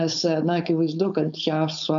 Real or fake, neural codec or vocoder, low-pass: fake; codec, 16 kHz, 4.8 kbps, FACodec; 7.2 kHz